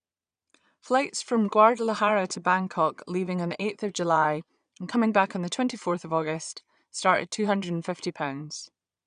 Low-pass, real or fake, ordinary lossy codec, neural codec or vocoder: 9.9 kHz; fake; none; vocoder, 22.05 kHz, 80 mel bands, Vocos